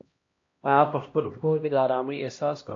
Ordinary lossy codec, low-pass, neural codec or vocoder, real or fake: AAC, 48 kbps; 7.2 kHz; codec, 16 kHz, 1 kbps, X-Codec, HuBERT features, trained on LibriSpeech; fake